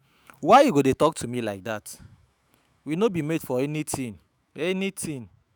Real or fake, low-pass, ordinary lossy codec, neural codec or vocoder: fake; none; none; autoencoder, 48 kHz, 128 numbers a frame, DAC-VAE, trained on Japanese speech